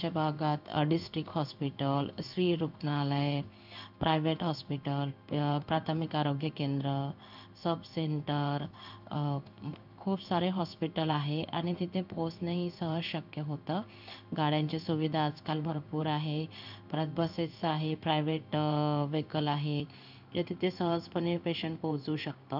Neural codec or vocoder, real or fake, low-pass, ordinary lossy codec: codec, 16 kHz in and 24 kHz out, 1 kbps, XY-Tokenizer; fake; 5.4 kHz; none